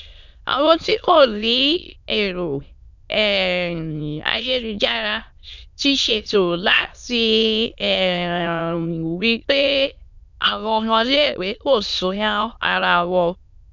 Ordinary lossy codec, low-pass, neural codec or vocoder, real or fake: none; 7.2 kHz; autoencoder, 22.05 kHz, a latent of 192 numbers a frame, VITS, trained on many speakers; fake